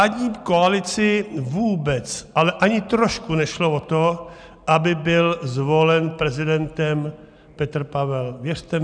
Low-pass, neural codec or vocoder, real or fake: 9.9 kHz; none; real